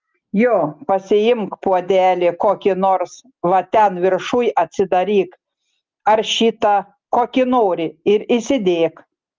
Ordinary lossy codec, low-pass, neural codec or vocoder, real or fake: Opus, 32 kbps; 7.2 kHz; none; real